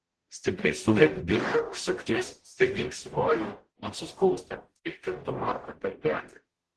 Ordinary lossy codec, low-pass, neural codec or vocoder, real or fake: Opus, 16 kbps; 10.8 kHz; codec, 44.1 kHz, 0.9 kbps, DAC; fake